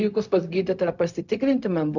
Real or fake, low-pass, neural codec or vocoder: fake; 7.2 kHz; codec, 16 kHz, 0.4 kbps, LongCat-Audio-Codec